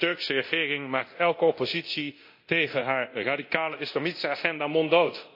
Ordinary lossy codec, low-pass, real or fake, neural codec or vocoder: MP3, 32 kbps; 5.4 kHz; fake; codec, 24 kHz, 0.9 kbps, DualCodec